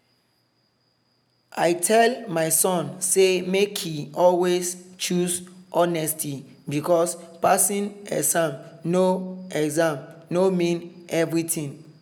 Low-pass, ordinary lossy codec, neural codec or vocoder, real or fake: none; none; none; real